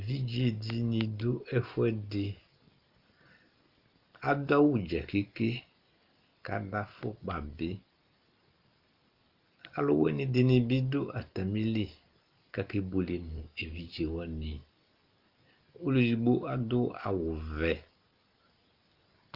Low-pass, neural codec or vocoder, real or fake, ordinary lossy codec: 5.4 kHz; none; real; Opus, 32 kbps